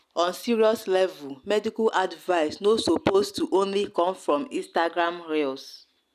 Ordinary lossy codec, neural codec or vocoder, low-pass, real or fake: none; none; 14.4 kHz; real